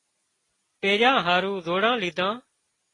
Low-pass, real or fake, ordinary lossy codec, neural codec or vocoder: 10.8 kHz; real; AAC, 32 kbps; none